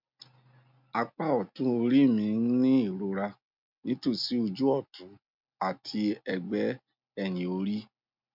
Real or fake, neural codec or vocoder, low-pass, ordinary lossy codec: real; none; 5.4 kHz; MP3, 48 kbps